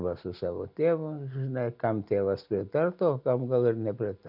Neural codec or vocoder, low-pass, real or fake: none; 5.4 kHz; real